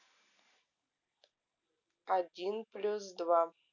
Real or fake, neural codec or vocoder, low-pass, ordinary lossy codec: real; none; 7.2 kHz; none